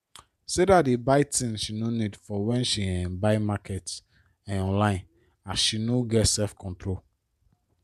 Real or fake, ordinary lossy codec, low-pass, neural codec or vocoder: fake; none; 14.4 kHz; vocoder, 48 kHz, 128 mel bands, Vocos